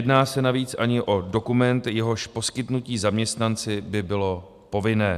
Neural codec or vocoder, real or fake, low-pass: none; real; 14.4 kHz